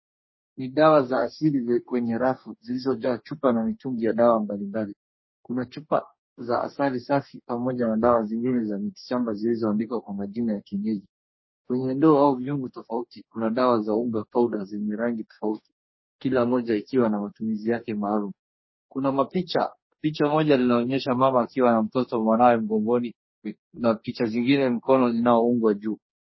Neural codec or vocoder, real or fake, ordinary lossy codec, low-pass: codec, 44.1 kHz, 2.6 kbps, DAC; fake; MP3, 24 kbps; 7.2 kHz